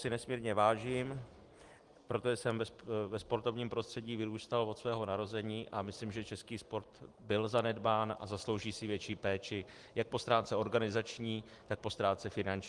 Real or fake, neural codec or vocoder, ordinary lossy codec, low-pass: real; none; Opus, 24 kbps; 10.8 kHz